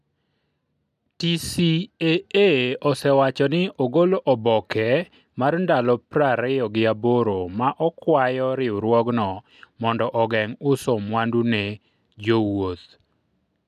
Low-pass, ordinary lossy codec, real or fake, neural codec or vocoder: 14.4 kHz; none; real; none